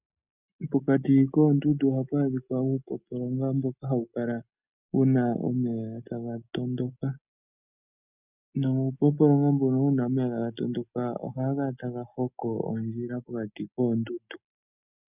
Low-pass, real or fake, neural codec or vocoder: 3.6 kHz; real; none